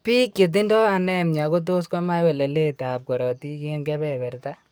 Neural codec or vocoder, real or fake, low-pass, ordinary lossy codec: codec, 44.1 kHz, 7.8 kbps, DAC; fake; none; none